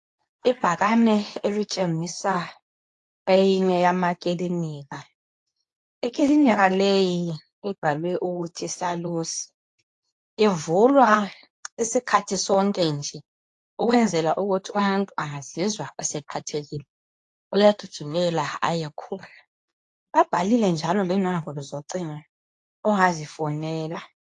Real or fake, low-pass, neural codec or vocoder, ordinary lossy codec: fake; 10.8 kHz; codec, 24 kHz, 0.9 kbps, WavTokenizer, medium speech release version 2; AAC, 48 kbps